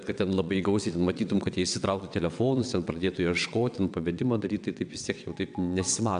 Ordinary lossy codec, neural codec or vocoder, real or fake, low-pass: MP3, 96 kbps; vocoder, 22.05 kHz, 80 mel bands, WaveNeXt; fake; 9.9 kHz